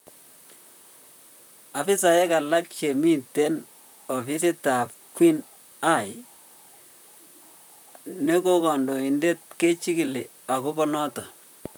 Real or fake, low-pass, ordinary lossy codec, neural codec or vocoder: fake; none; none; vocoder, 44.1 kHz, 128 mel bands, Pupu-Vocoder